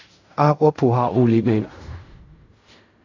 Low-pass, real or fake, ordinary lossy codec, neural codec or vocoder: 7.2 kHz; fake; AAC, 48 kbps; codec, 16 kHz in and 24 kHz out, 0.4 kbps, LongCat-Audio-Codec, fine tuned four codebook decoder